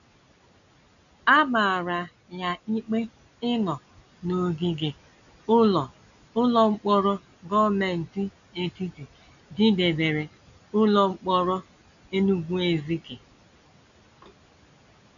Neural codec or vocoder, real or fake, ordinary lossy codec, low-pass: none; real; none; 7.2 kHz